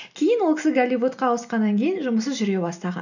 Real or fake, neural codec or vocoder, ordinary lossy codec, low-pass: real; none; none; 7.2 kHz